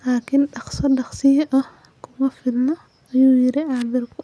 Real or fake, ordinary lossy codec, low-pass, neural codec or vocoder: real; none; none; none